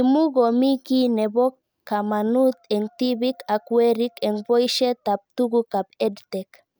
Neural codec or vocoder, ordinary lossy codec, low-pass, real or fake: none; none; none; real